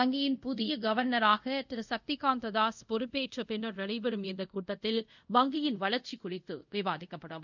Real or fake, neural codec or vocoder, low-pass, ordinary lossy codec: fake; codec, 24 kHz, 0.5 kbps, DualCodec; 7.2 kHz; none